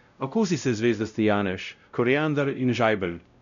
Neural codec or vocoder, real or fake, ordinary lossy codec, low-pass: codec, 16 kHz, 0.5 kbps, X-Codec, WavLM features, trained on Multilingual LibriSpeech; fake; none; 7.2 kHz